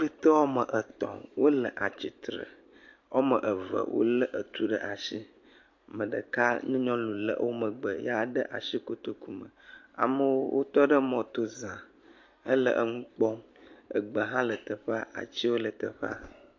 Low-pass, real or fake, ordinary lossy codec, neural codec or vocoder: 7.2 kHz; real; MP3, 48 kbps; none